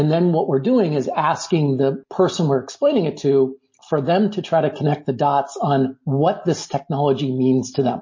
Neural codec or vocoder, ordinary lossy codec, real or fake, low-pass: none; MP3, 32 kbps; real; 7.2 kHz